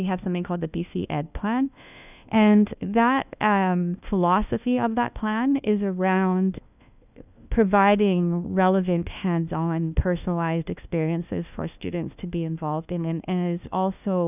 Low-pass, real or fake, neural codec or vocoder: 3.6 kHz; fake; codec, 16 kHz, 1 kbps, FunCodec, trained on LibriTTS, 50 frames a second